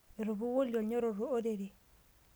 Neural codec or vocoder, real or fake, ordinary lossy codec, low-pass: none; real; none; none